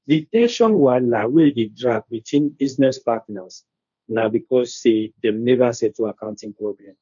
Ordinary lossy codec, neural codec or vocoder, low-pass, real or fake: none; codec, 16 kHz, 1.1 kbps, Voila-Tokenizer; 7.2 kHz; fake